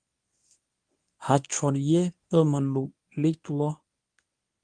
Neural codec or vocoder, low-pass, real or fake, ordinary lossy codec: codec, 24 kHz, 0.9 kbps, WavTokenizer, medium speech release version 1; 9.9 kHz; fake; Opus, 32 kbps